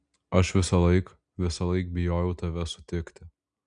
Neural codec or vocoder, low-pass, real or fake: none; 9.9 kHz; real